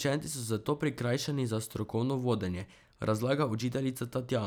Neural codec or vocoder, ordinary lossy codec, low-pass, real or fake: none; none; none; real